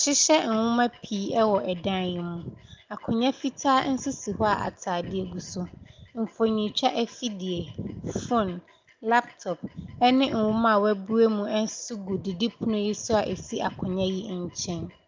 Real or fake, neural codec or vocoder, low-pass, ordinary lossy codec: real; none; 7.2 kHz; Opus, 24 kbps